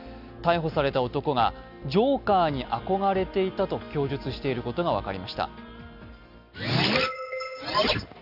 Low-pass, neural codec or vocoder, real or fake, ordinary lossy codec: 5.4 kHz; none; real; none